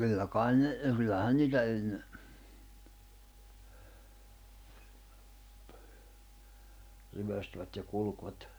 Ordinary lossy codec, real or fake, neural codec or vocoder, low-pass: none; real; none; none